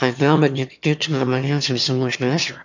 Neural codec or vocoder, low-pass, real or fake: autoencoder, 22.05 kHz, a latent of 192 numbers a frame, VITS, trained on one speaker; 7.2 kHz; fake